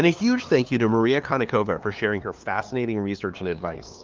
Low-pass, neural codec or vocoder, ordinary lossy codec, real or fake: 7.2 kHz; codec, 16 kHz, 2 kbps, FunCodec, trained on LibriTTS, 25 frames a second; Opus, 32 kbps; fake